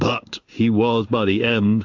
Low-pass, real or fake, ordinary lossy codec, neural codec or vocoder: 7.2 kHz; fake; AAC, 48 kbps; codec, 16 kHz in and 24 kHz out, 1 kbps, XY-Tokenizer